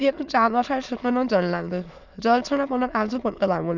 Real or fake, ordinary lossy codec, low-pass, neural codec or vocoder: fake; none; 7.2 kHz; autoencoder, 22.05 kHz, a latent of 192 numbers a frame, VITS, trained on many speakers